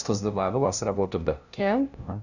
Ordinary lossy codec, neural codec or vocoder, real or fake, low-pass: none; codec, 16 kHz, 0.5 kbps, FunCodec, trained on LibriTTS, 25 frames a second; fake; 7.2 kHz